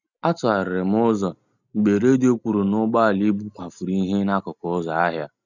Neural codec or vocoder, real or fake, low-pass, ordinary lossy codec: none; real; 7.2 kHz; none